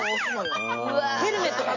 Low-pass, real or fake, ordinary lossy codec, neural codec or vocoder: 7.2 kHz; real; none; none